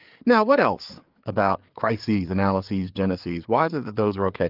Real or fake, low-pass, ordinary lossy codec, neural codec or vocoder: fake; 5.4 kHz; Opus, 32 kbps; codec, 16 kHz, 4 kbps, FreqCodec, larger model